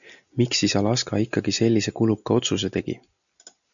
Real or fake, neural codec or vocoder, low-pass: real; none; 7.2 kHz